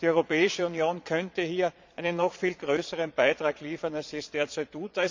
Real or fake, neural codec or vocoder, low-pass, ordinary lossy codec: fake; vocoder, 22.05 kHz, 80 mel bands, Vocos; 7.2 kHz; MP3, 64 kbps